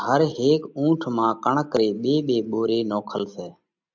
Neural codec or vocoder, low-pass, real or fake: none; 7.2 kHz; real